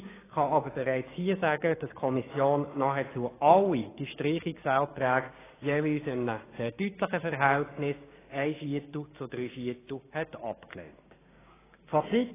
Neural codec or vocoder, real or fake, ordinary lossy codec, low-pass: codec, 16 kHz, 6 kbps, DAC; fake; AAC, 16 kbps; 3.6 kHz